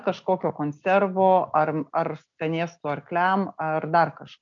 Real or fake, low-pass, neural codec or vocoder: real; 7.2 kHz; none